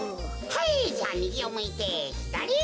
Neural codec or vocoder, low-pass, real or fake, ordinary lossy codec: none; none; real; none